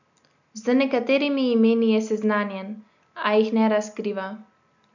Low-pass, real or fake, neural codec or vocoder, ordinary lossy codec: 7.2 kHz; real; none; none